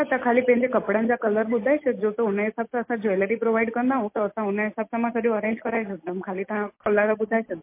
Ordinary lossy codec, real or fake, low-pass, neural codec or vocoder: MP3, 24 kbps; real; 3.6 kHz; none